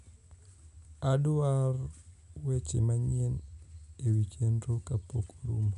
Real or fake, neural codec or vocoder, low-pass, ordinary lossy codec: real; none; 10.8 kHz; none